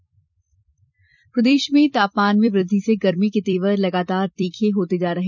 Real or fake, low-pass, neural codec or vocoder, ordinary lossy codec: fake; 7.2 kHz; vocoder, 44.1 kHz, 128 mel bands every 256 samples, BigVGAN v2; none